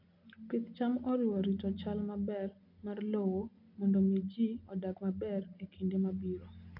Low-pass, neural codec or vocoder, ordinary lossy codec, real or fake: 5.4 kHz; none; none; real